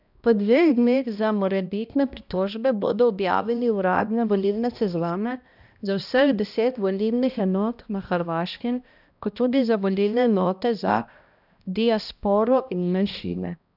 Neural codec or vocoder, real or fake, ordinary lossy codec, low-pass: codec, 16 kHz, 1 kbps, X-Codec, HuBERT features, trained on balanced general audio; fake; none; 5.4 kHz